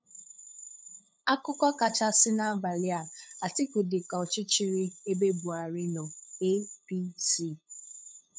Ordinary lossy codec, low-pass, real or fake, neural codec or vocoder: none; none; fake; codec, 16 kHz, 8 kbps, FunCodec, trained on LibriTTS, 25 frames a second